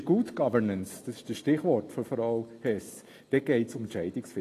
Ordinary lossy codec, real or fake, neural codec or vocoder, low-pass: AAC, 48 kbps; real; none; 14.4 kHz